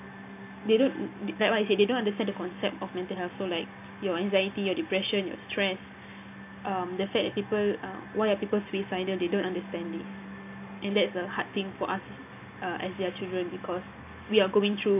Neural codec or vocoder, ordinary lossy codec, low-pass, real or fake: none; AAC, 32 kbps; 3.6 kHz; real